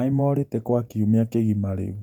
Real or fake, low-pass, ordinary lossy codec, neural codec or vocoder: fake; 19.8 kHz; none; vocoder, 48 kHz, 128 mel bands, Vocos